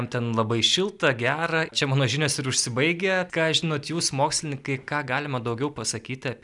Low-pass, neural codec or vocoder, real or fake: 10.8 kHz; none; real